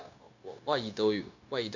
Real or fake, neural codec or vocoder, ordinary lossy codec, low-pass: fake; codec, 16 kHz, 0.9 kbps, LongCat-Audio-Codec; none; 7.2 kHz